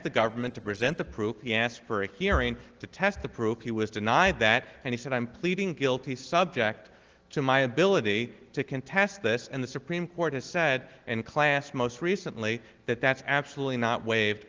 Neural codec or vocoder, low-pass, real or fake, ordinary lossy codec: none; 7.2 kHz; real; Opus, 16 kbps